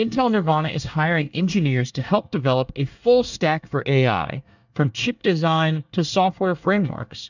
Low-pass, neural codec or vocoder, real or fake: 7.2 kHz; codec, 24 kHz, 1 kbps, SNAC; fake